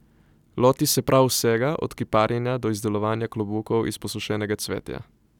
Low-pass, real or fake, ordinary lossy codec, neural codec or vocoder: 19.8 kHz; real; none; none